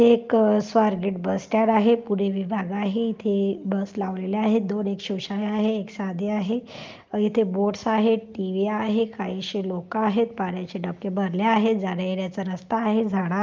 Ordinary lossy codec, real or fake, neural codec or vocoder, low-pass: Opus, 24 kbps; real; none; 7.2 kHz